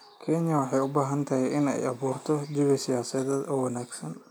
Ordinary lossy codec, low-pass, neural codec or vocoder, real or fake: none; none; none; real